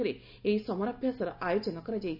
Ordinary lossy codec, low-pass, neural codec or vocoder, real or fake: none; 5.4 kHz; none; real